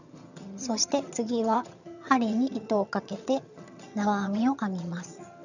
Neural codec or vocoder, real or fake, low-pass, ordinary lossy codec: vocoder, 22.05 kHz, 80 mel bands, HiFi-GAN; fake; 7.2 kHz; none